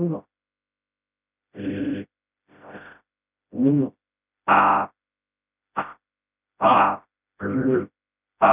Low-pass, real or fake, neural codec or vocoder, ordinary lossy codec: 3.6 kHz; fake; codec, 16 kHz, 0.5 kbps, FreqCodec, smaller model; none